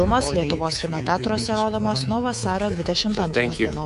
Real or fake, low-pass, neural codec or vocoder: fake; 10.8 kHz; codec, 24 kHz, 3.1 kbps, DualCodec